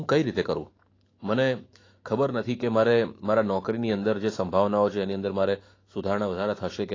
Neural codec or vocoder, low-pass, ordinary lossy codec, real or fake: autoencoder, 48 kHz, 128 numbers a frame, DAC-VAE, trained on Japanese speech; 7.2 kHz; AAC, 32 kbps; fake